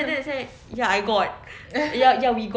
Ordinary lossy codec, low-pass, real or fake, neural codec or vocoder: none; none; real; none